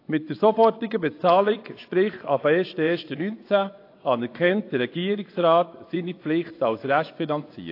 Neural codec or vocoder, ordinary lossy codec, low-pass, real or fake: vocoder, 24 kHz, 100 mel bands, Vocos; AAC, 32 kbps; 5.4 kHz; fake